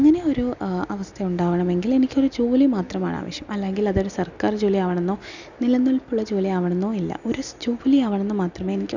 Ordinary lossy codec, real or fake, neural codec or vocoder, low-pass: none; real; none; 7.2 kHz